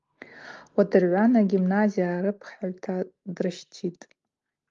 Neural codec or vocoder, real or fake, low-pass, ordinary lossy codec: none; real; 7.2 kHz; Opus, 32 kbps